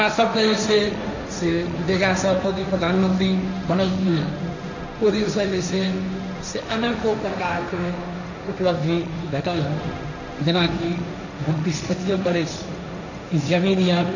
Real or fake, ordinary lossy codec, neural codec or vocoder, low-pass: fake; none; codec, 16 kHz, 1.1 kbps, Voila-Tokenizer; 7.2 kHz